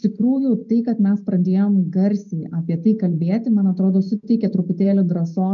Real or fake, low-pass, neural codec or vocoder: real; 7.2 kHz; none